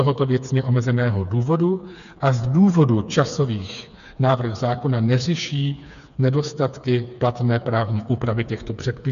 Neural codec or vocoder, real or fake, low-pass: codec, 16 kHz, 4 kbps, FreqCodec, smaller model; fake; 7.2 kHz